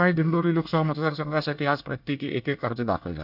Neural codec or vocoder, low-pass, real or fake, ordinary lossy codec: codec, 24 kHz, 1 kbps, SNAC; 5.4 kHz; fake; none